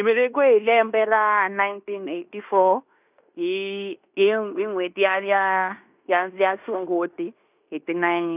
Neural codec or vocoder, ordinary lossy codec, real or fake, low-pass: codec, 16 kHz in and 24 kHz out, 0.9 kbps, LongCat-Audio-Codec, fine tuned four codebook decoder; none; fake; 3.6 kHz